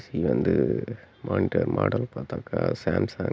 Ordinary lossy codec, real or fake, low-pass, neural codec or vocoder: none; real; none; none